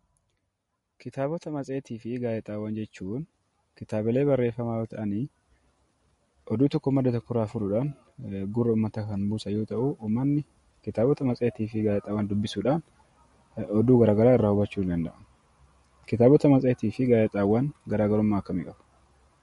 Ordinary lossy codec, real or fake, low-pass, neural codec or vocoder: MP3, 48 kbps; real; 19.8 kHz; none